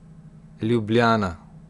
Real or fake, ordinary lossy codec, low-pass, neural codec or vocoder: real; none; 10.8 kHz; none